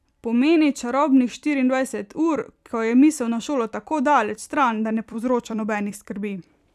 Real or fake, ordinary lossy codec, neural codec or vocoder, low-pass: fake; none; vocoder, 44.1 kHz, 128 mel bands every 256 samples, BigVGAN v2; 14.4 kHz